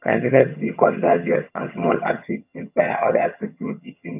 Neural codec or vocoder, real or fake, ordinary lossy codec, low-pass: vocoder, 22.05 kHz, 80 mel bands, HiFi-GAN; fake; none; 3.6 kHz